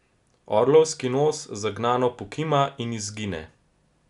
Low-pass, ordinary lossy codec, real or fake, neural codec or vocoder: 10.8 kHz; none; real; none